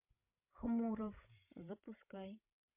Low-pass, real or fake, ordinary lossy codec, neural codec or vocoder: 3.6 kHz; fake; MP3, 32 kbps; codec, 16 kHz, 16 kbps, FreqCodec, larger model